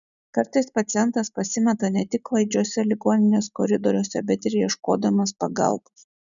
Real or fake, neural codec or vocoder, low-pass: real; none; 7.2 kHz